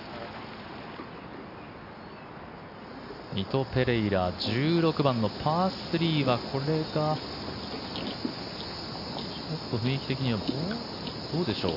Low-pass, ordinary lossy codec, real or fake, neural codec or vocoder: 5.4 kHz; none; real; none